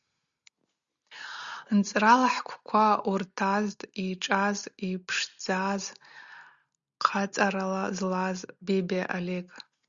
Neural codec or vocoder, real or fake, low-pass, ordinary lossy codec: none; real; 7.2 kHz; Opus, 64 kbps